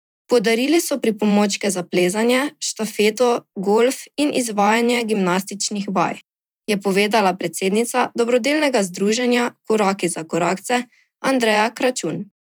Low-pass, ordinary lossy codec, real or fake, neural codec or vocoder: none; none; fake; vocoder, 44.1 kHz, 128 mel bands every 512 samples, BigVGAN v2